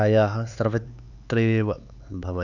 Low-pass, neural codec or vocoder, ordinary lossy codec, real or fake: 7.2 kHz; codec, 16 kHz, 4 kbps, X-Codec, HuBERT features, trained on LibriSpeech; none; fake